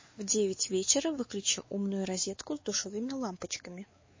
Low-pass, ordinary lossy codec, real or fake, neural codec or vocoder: 7.2 kHz; MP3, 32 kbps; fake; codec, 16 kHz, 4 kbps, X-Codec, WavLM features, trained on Multilingual LibriSpeech